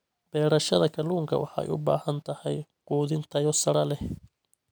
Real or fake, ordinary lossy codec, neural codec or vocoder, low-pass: real; none; none; none